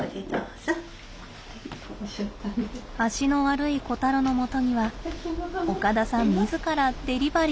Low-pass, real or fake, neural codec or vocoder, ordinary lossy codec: none; real; none; none